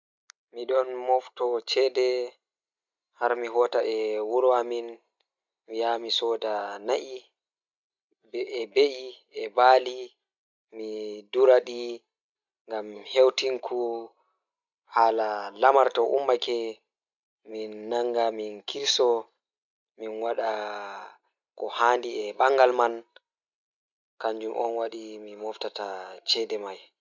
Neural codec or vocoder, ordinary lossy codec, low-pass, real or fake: none; none; 7.2 kHz; real